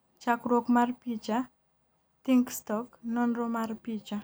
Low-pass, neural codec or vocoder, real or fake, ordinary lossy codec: none; none; real; none